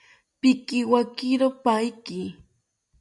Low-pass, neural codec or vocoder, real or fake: 10.8 kHz; none; real